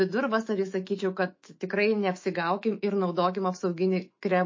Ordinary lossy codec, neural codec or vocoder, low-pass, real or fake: MP3, 32 kbps; autoencoder, 48 kHz, 128 numbers a frame, DAC-VAE, trained on Japanese speech; 7.2 kHz; fake